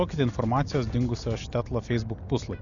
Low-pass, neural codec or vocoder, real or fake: 7.2 kHz; none; real